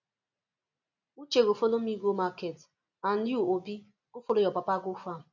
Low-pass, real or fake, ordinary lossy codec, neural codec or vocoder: 7.2 kHz; real; none; none